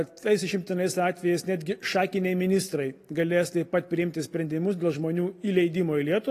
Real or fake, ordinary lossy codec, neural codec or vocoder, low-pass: real; AAC, 48 kbps; none; 14.4 kHz